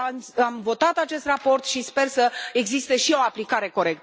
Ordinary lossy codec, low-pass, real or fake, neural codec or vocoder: none; none; real; none